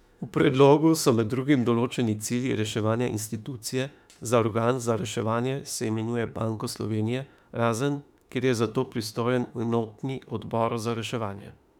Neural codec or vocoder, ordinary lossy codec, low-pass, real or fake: autoencoder, 48 kHz, 32 numbers a frame, DAC-VAE, trained on Japanese speech; none; 19.8 kHz; fake